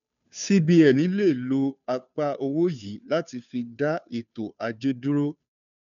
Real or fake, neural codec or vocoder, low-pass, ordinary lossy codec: fake; codec, 16 kHz, 2 kbps, FunCodec, trained on Chinese and English, 25 frames a second; 7.2 kHz; none